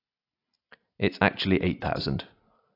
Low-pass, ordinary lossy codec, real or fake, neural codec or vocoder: 5.4 kHz; AAC, 32 kbps; real; none